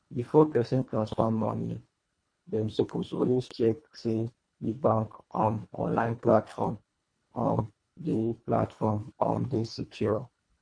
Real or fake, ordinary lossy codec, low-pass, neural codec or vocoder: fake; MP3, 48 kbps; 9.9 kHz; codec, 24 kHz, 1.5 kbps, HILCodec